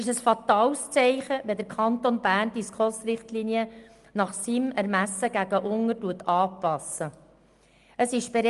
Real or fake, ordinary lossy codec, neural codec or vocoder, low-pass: real; Opus, 32 kbps; none; 10.8 kHz